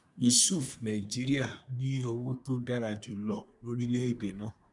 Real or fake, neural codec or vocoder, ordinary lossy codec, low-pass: fake; codec, 24 kHz, 1 kbps, SNAC; none; 10.8 kHz